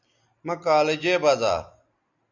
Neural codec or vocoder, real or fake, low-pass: none; real; 7.2 kHz